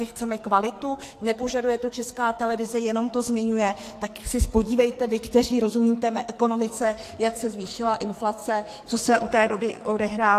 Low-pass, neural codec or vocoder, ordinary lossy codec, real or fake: 14.4 kHz; codec, 44.1 kHz, 2.6 kbps, SNAC; AAC, 64 kbps; fake